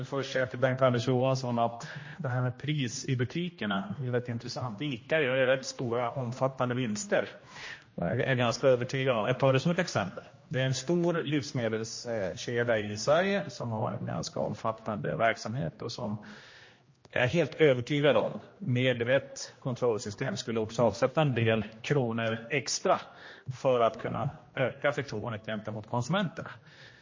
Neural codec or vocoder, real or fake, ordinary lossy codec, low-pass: codec, 16 kHz, 1 kbps, X-Codec, HuBERT features, trained on general audio; fake; MP3, 32 kbps; 7.2 kHz